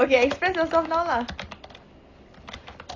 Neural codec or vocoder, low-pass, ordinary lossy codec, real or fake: none; 7.2 kHz; AAC, 32 kbps; real